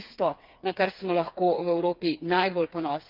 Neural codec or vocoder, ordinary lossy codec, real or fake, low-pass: codec, 16 kHz, 4 kbps, FreqCodec, smaller model; Opus, 32 kbps; fake; 5.4 kHz